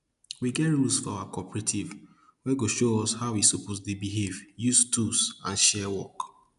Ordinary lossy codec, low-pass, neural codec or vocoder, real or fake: none; 10.8 kHz; none; real